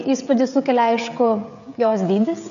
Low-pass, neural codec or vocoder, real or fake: 7.2 kHz; codec, 16 kHz, 16 kbps, FreqCodec, smaller model; fake